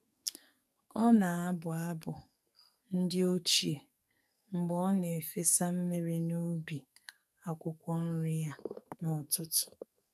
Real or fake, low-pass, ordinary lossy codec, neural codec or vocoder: fake; 14.4 kHz; none; codec, 44.1 kHz, 2.6 kbps, SNAC